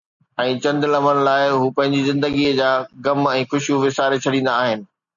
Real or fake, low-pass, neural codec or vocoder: real; 7.2 kHz; none